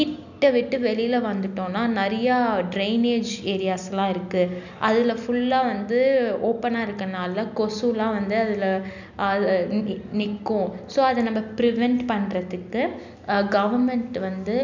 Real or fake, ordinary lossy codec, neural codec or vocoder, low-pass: real; none; none; 7.2 kHz